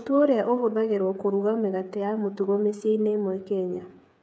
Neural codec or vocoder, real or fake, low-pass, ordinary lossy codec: codec, 16 kHz, 8 kbps, FreqCodec, smaller model; fake; none; none